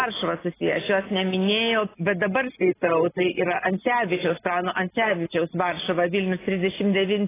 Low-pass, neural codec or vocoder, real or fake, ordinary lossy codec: 3.6 kHz; none; real; AAC, 16 kbps